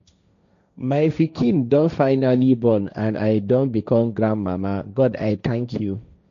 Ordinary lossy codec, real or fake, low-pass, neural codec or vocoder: none; fake; 7.2 kHz; codec, 16 kHz, 1.1 kbps, Voila-Tokenizer